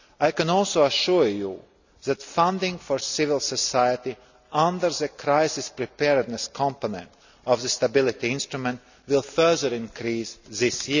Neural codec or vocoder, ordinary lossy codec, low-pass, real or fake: none; none; 7.2 kHz; real